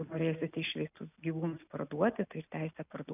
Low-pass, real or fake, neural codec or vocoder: 3.6 kHz; real; none